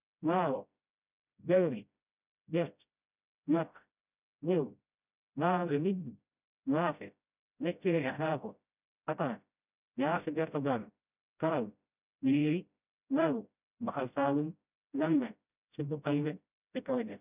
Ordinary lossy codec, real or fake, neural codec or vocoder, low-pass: none; fake; codec, 16 kHz, 0.5 kbps, FreqCodec, smaller model; 3.6 kHz